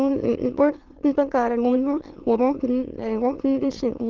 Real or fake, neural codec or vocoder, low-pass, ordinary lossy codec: fake; autoencoder, 22.05 kHz, a latent of 192 numbers a frame, VITS, trained on many speakers; 7.2 kHz; Opus, 16 kbps